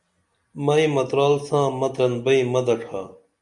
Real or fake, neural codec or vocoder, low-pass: real; none; 10.8 kHz